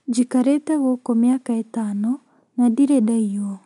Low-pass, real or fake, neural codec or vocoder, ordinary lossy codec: 10.8 kHz; real; none; none